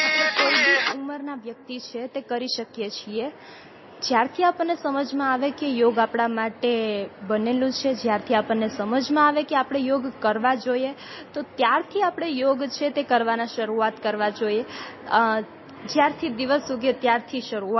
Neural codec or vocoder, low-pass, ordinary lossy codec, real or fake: none; 7.2 kHz; MP3, 24 kbps; real